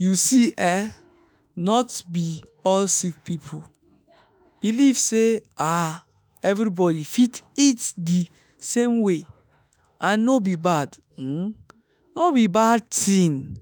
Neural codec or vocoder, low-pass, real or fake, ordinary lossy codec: autoencoder, 48 kHz, 32 numbers a frame, DAC-VAE, trained on Japanese speech; none; fake; none